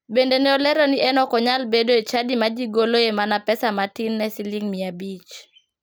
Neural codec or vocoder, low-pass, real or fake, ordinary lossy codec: none; none; real; none